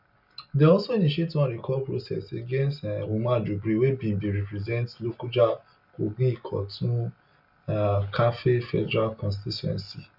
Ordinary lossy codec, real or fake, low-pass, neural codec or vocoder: none; real; 5.4 kHz; none